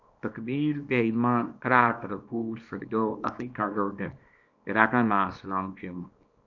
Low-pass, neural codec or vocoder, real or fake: 7.2 kHz; codec, 24 kHz, 0.9 kbps, WavTokenizer, small release; fake